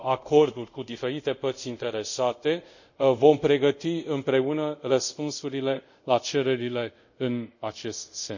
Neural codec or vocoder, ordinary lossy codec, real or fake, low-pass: codec, 24 kHz, 0.5 kbps, DualCodec; none; fake; 7.2 kHz